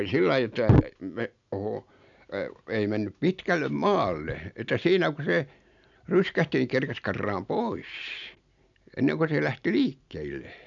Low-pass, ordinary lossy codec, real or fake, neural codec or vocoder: 7.2 kHz; none; real; none